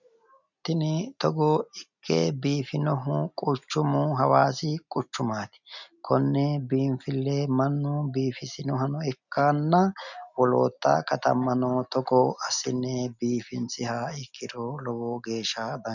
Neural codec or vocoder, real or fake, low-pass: none; real; 7.2 kHz